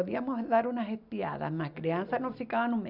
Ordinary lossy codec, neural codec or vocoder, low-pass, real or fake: none; none; 5.4 kHz; real